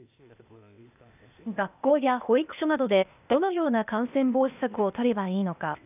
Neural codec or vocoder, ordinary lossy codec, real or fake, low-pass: codec, 16 kHz, 0.8 kbps, ZipCodec; none; fake; 3.6 kHz